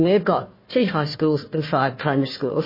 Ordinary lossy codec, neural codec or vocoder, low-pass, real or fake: MP3, 24 kbps; codec, 16 kHz, 1 kbps, FunCodec, trained on Chinese and English, 50 frames a second; 5.4 kHz; fake